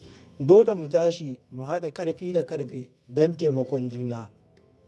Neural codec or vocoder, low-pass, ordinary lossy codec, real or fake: codec, 24 kHz, 0.9 kbps, WavTokenizer, medium music audio release; none; none; fake